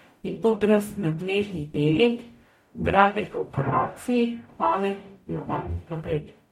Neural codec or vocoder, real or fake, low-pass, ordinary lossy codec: codec, 44.1 kHz, 0.9 kbps, DAC; fake; 19.8 kHz; MP3, 64 kbps